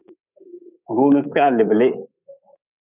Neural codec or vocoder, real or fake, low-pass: codec, 24 kHz, 3.1 kbps, DualCodec; fake; 3.6 kHz